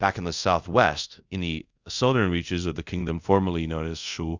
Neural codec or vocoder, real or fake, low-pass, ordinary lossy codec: codec, 24 kHz, 0.5 kbps, DualCodec; fake; 7.2 kHz; Opus, 64 kbps